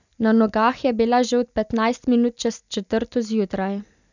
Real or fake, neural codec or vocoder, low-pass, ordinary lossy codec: real; none; 7.2 kHz; none